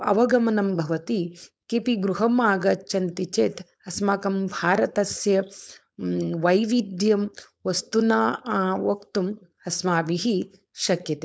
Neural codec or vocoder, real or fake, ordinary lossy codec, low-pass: codec, 16 kHz, 4.8 kbps, FACodec; fake; none; none